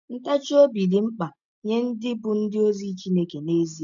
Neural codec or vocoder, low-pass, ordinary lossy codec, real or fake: none; 7.2 kHz; none; real